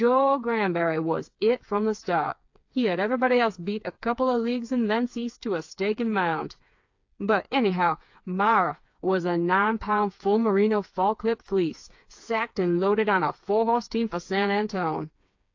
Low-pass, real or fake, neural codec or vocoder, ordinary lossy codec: 7.2 kHz; fake; codec, 16 kHz, 4 kbps, FreqCodec, smaller model; AAC, 48 kbps